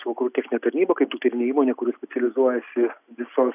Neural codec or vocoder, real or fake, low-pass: none; real; 3.6 kHz